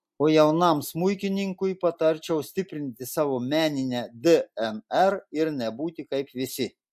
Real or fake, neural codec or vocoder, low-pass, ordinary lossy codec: real; none; 14.4 kHz; MP3, 64 kbps